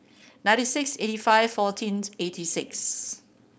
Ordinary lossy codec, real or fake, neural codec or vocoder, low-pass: none; fake; codec, 16 kHz, 4.8 kbps, FACodec; none